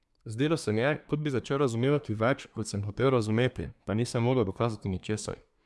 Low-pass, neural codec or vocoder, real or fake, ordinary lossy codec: none; codec, 24 kHz, 1 kbps, SNAC; fake; none